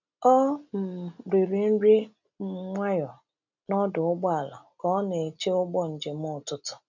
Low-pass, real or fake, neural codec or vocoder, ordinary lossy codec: 7.2 kHz; real; none; none